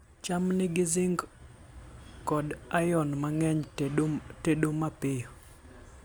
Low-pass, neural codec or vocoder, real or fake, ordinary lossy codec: none; none; real; none